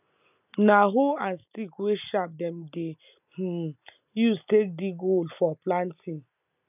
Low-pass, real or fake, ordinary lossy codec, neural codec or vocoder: 3.6 kHz; real; none; none